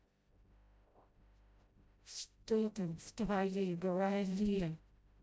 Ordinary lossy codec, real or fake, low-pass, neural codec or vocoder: none; fake; none; codec, 16 kHz, 0.5 kbps, FreqCodec, smaller model